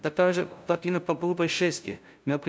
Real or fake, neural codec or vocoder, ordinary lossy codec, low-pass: fake; codec, 16 kHz, 0.5 kbps, FunCodec, trained on LibriTTS, 25 frames a second; none; none